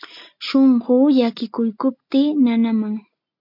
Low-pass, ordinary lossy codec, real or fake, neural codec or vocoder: 5.4 kHz; MP3, 48 kbps; real; none